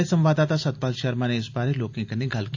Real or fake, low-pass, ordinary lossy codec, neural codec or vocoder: real; 7.2 kHz; AAC, 48 kbps; none